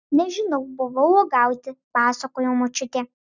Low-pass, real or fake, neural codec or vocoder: 7.2 kHz; real; none